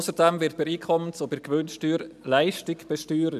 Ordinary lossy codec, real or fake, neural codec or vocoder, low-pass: none; real; none; 14.4 kHz